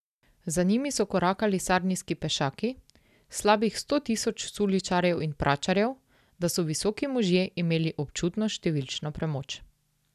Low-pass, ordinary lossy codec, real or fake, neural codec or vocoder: 14.4 kHz; none; real; none